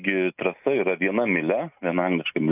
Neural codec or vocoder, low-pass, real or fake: none; 3.6 kHz; real